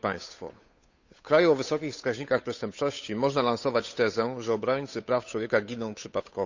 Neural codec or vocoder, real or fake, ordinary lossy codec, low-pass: codec, 16 kHz, 8 kbps, FunCodec, trained on Chinese and English, 25 frames a second; fake; Opus, 64 kbps; 7.2 kHz